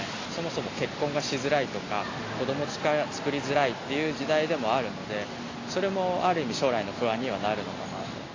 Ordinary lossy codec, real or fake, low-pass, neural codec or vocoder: AAC, 32 kbps; real; 7.2 kHz; none